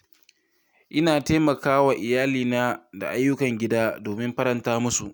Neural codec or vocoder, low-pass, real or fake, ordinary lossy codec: none; none; real; none